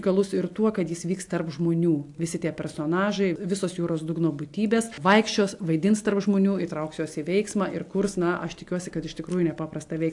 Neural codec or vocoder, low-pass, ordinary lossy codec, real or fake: none; 10.8 kHz; AAC, 64 kbps; real